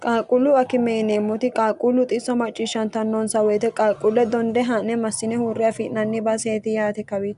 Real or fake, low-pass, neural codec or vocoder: real; 10.8 kHz; none